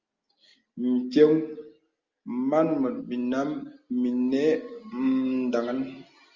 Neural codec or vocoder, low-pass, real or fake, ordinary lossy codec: none; 7.2 kHz; real; Opus, 32 kbps